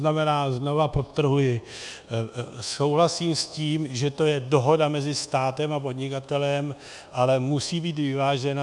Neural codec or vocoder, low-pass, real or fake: codec, 24 kHz, 1.2 kbps, DualCodec; 10.8 kHz; fake